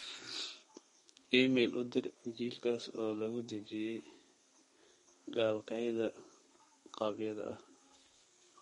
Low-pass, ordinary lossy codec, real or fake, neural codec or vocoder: 14.4 kHz; MP3, 48 kbps; fake; codec, 32 kHz, 1.9 kbps, SNAC